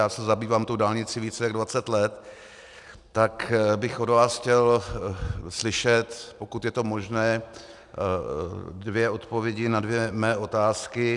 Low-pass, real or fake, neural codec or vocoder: 10.8 kHz; real; none